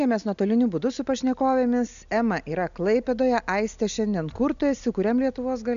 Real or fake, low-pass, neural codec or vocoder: real; 7.2 kHz; none